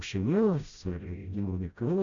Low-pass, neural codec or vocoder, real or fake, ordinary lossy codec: 7.2 kHz; codec, 16 kHz, 0.5 kbps, FreqCodec, smaller model; fake; MP3, 64 kbps